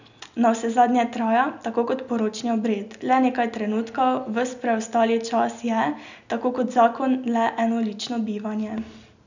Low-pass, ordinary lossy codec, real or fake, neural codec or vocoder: 7.2 kHz; none; real; none